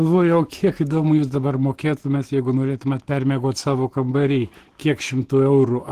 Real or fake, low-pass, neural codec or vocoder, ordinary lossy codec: fake; 14.4 kHz; autoencoder, 48 kHz, 128 numbers a frame, DAC-VAE, trained on Japanese speech; Opus, 16 kbps